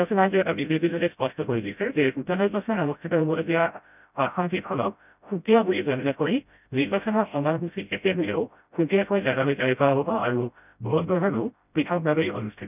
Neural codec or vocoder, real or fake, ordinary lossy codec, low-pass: codec, 16 kHz, 0.5 kbps, FreqCodec, smaller model; fake; none; 3.6 kHz